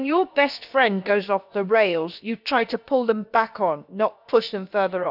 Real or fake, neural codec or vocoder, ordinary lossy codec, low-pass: fake; codec, 16 kHz, about 1 kbps, DyCAST, with the encoder's durations; none; 5.4 kHz